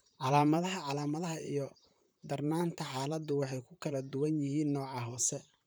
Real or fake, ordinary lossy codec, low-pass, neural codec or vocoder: fake; none; none; vocoder, 44.1 kHz, 128 mel bands, Pupu-Vocoder